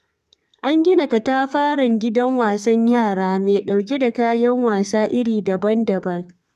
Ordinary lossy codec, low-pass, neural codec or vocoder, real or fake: none; 14.4 kHz; codec, 32 kHz, 1.9 kbps, SNAC; fake